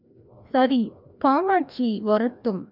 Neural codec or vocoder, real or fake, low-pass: codec, 16 kHz, 1 kbps, FreqCodec, larger model; fake; 5.4 kHz